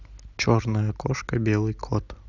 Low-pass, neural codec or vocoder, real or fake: 7.2 kHz; none; real